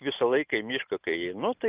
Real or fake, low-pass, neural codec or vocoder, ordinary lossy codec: real; 3.6 kHz; none; Opus, 24 kbps